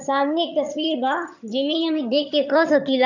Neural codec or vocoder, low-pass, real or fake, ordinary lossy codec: vocoder, 22.05 kHz, 80 mel bands, HiFi-GAN; 7.2 kHz; fake; none